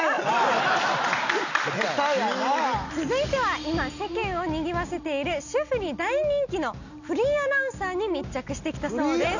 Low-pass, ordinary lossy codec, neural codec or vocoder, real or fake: 7.2 kHz; none; none; real